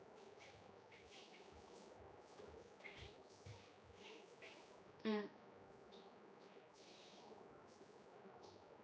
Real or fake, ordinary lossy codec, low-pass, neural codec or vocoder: fake; none; none; codec, 16 kHz, 2 kbps, X-Codec, HuBERT features, trained on general audio